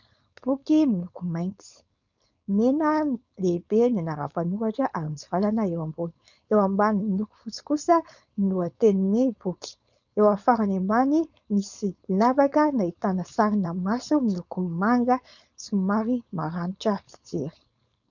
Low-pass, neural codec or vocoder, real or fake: 7.2 kHz; codec, 16 kHz, 4.8 kbps, FACodec; fake